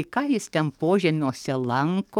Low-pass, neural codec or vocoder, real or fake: 19.8 kHz; codec, 44.1 kHz, 7.8 kbps, DAC; fake